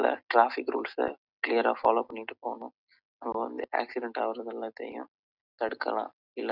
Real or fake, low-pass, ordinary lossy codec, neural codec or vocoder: real; 5.4 kHz; none; none